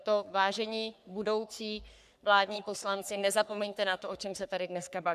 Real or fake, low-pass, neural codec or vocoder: fake; 14.4 kHz; codec, 44.1 kHz, 3.4 kbps, Pupu-Codec